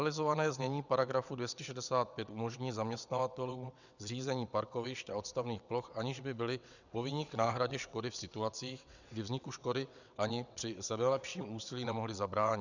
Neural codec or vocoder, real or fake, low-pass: vocoder, 22.05 kHz, 80 mel bands, WaveNeXt; fake; 7.2 kHz